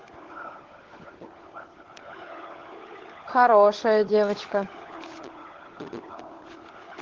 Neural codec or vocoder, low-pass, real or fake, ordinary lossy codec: codec, 16 kHz, 16 kbps, FunCodec, trained on LibriTTS, 50 frames a second; 7.2 kHz; fake; Opus, 16 kbps